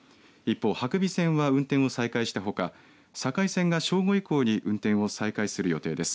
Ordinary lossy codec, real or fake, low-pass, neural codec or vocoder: none; real; none; none